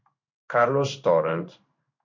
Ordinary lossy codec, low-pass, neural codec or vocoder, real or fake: MP3, 48 kbps; 7.2 kHz; codec, 16 kHz in and 24 kHz out, 1 kbps, XY-Tokenizer; fake